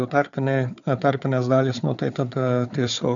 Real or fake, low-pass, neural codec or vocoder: fake; 7.2 kHz; codec, 16 kHz, 4 kbps, FunCodec, trained on Chinese and English, 50 frames a second